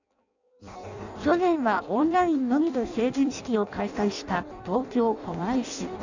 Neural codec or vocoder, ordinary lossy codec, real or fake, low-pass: codec, 16 kHz in and 24 kHz out, 0.6 kbps, FireRedTTS-2 codec; none; fake; 7.2 kHz